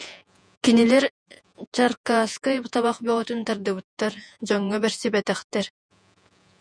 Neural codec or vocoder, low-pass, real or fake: vocoder, 48 kHz, 128 mel bands, Vocos; 9.9 kHz; fake